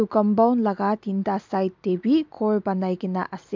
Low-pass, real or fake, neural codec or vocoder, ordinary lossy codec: 7.2 kHz; real; none; MP3, 64 kbps